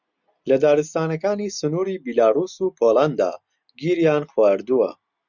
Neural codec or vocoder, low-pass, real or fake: none; 7.2 kHz; real